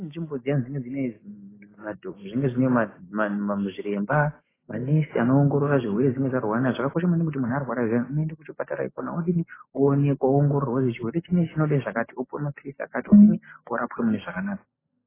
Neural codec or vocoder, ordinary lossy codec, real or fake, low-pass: none; AAC, 16 kbps; real; 3.6 kHz